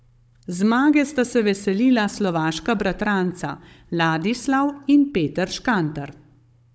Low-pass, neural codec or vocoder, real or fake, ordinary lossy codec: none; codec, 16 kHz, 16 kbps, FunCodec, trained on Chinese and English, 50 frames a second; fake; none